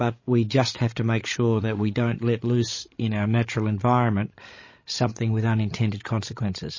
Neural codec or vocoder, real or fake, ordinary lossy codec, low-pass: codec, 16 kHz, 16 kbps, FreqCodec, larger model; fake; MP3, 32 kbps; 7.2 kHz